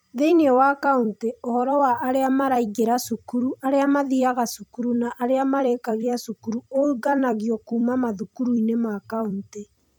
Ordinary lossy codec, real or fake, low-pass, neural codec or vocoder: none; fake; none; vocoder, 44.1 kHz, 128 mel bands every 256 samples, BigVGAN v2